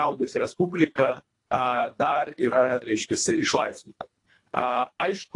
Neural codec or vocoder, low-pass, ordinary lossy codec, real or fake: codec, 24 kHz, 1.5 kbps, HILCodec; 10.8 kHz; AAC, 48 kbps; fake